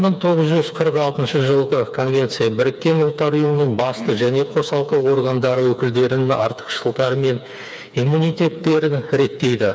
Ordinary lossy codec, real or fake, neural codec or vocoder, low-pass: none; fake; codec, 16 kHz, 4 kbps, FreqCodec, smaller model; none